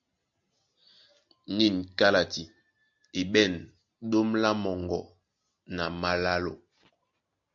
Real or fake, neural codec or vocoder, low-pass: real; none; 7.2 kHz